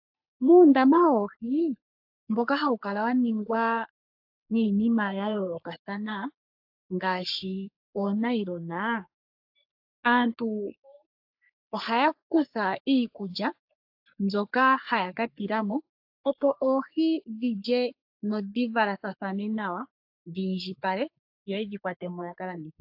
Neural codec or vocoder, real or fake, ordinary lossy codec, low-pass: codec, 44.1 kHz, 3.4 kbps, Pupu-Codec; fake; AAC, 48 kbps; 5.4 kHz